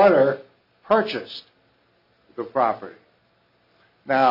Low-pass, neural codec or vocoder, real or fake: 5.4 kHz; none; real